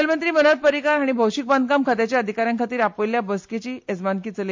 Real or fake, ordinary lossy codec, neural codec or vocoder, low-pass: real; MP3, 48 kbps; none; 7.2 kHz